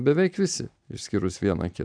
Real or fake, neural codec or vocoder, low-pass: real; none; 9.9 kHz